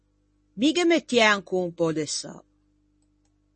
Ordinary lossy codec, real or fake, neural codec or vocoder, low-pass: MP3, 32 kbps; real; none; 10.8 kHz